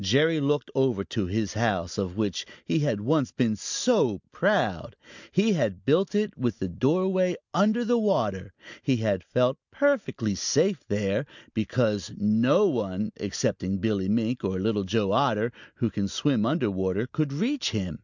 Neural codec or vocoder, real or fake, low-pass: none; real; 7.2 kHz